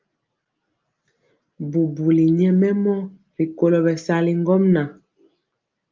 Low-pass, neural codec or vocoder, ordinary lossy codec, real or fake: 7.2 kHz; none; Opus, 24 kbps; real